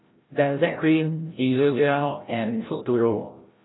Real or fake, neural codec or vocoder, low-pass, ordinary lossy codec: fake; codec, 16 kHz, 0.5 kbps, FreqCodec, larger model; 7.2 kHz; AAC, 16 kbps